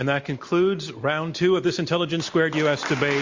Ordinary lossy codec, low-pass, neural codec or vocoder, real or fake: MP3, 48 kbps; 7.2 kHz; none; real